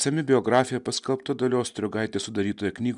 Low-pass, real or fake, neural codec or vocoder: 10.8 kHz; real; none